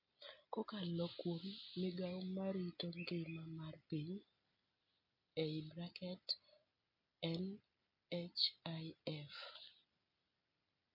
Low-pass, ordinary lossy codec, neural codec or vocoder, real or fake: 5.4 kHz; MP3, 32 kbps; none; real